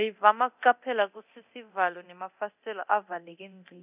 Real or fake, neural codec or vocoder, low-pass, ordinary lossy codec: fake; codec, 24 kHz, 0.9 kbps, DualCodec; 3.6 kHz; none